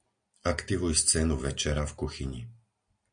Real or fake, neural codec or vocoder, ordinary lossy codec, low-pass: real; none; MP3, 64 kbps; 9.9 kHz